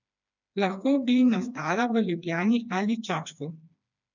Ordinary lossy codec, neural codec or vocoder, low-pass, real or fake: none; codec, 16 kHz, 2 kbps, FreqCodec, smaller model; 7.2 kHz; fake